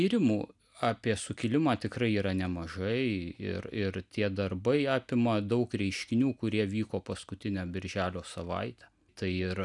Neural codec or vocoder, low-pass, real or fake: none; 10.8 kHz; real